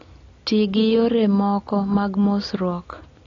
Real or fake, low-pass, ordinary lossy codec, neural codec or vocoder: real; 7.2 kHz; AAC, 32 kbps; none